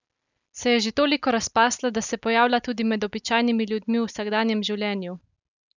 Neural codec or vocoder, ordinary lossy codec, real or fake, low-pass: none; none; real; 7.2 kHz